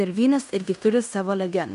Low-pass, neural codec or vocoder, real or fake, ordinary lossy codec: 10.8 kHz; codec, 16 kHz in and 24 kHz out, 0.9 kbps, LongCat-Audio-Codec, fine tuned four codebook decoder; fake; AAC, 96 kbps